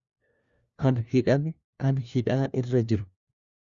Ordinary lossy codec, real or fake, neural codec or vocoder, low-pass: Opus, 64 kbps; fake; codec, 16 kHz, 1 kbps, FunCodec, trained on LibriTTS, 50 frames a second; 7.2 kHz